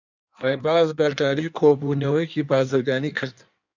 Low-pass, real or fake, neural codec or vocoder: 7.2 kHz; fake; codec, 16 kHz in and 24 kHz out, 1.1 kbps, FireRedTTS-2 codec